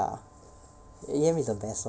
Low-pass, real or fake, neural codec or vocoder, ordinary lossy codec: none; real; none; none